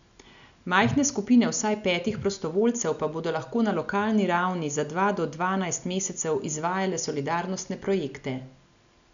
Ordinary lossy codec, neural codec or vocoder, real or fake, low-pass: none; none; real; 7.2 kHz